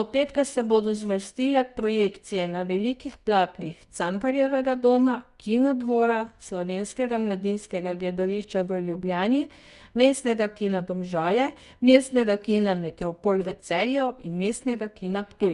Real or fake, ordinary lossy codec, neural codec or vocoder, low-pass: fake; Opus, 64 kbps; codec, 24 kHz, 0.9 kbps, WavTokenizer, medium music audio release; 10.8 kHz